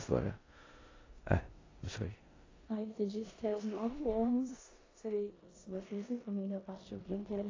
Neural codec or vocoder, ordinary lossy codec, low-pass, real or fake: codec, 16 kHz in and 24 kHz out, 0.9 kbps, LongCat-Audio-Codec, four codebook decoder; AAC, 32 kbps; 7.2 kHz; fake